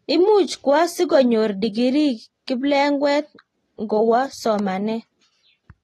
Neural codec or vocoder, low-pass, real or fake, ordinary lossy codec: none; 19.8 kHz; real; AAC, 32 kbps